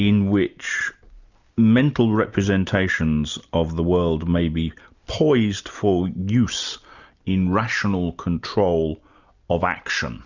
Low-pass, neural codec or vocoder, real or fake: 7.2 kHz; none; real